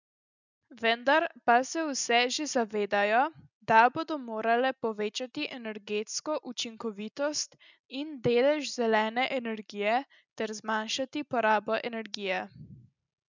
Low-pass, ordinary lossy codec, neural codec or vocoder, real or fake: 7.2 kHz; none; none; real